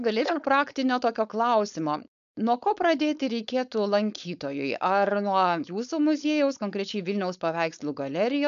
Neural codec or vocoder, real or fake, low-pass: codec, 16 kHz, 4.8 kbps, FACodec; fake; 7.2 kHz